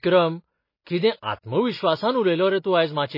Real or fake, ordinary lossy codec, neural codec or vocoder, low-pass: real; MP3, 24 kbps; none; 5.4 kHz